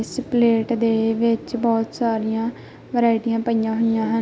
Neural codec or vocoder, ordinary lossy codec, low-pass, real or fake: none; none; none; real